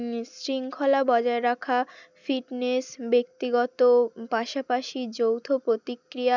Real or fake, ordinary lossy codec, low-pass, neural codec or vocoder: real; none; 7.2 kHz; none